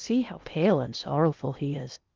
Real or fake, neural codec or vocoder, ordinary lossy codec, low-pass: fake; codec, 16 kHz in and 24 kHz out, 0.6 kbps, FocalCodec, streaming, 2048 codes; Opus, 24 kbps; 7.2 kHz